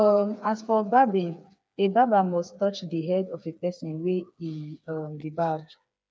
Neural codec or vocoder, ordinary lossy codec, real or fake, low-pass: codec, 16 kHz, 4 kbps, FreqCodec, smaller model; none; fake; none